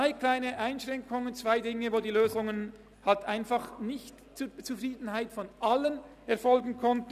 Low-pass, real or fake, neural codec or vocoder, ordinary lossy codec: 14.4 kHz; real; none; none